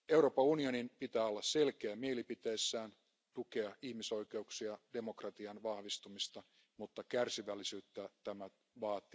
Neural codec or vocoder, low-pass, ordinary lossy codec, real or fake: none; none; none; real